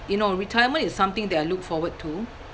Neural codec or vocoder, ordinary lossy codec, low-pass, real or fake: none; none; none; real